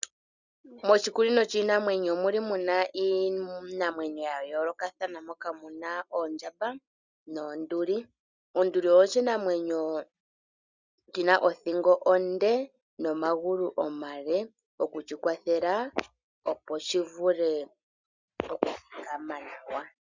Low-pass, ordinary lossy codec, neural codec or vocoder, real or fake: 7.2 kHz; Opus, 64 kbps; none; real